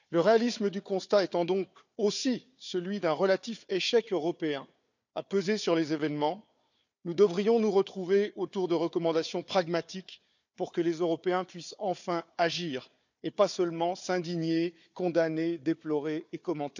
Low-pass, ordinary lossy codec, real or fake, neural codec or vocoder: 7.2 kHz; none; fake; codec, 16 kHz, 4 kbps, FunCodec, trained on Chinese and English, 50 frames a second